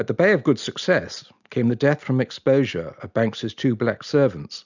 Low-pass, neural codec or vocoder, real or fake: 7.2 kHz; none; real